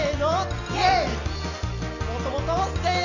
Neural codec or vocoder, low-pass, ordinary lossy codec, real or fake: none; 7.2 kHz; none; real